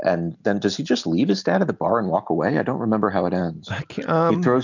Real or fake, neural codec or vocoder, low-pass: real; none; 7.2 kHz